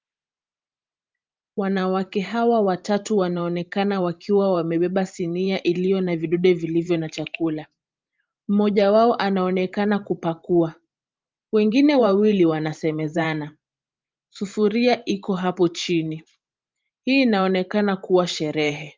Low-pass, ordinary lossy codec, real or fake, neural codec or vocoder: 7.2 kHz; Opus, 24 kbps; fake; vocoder, 44.1 kHz, 128 mel bands every 512 samples, BigVGAN v2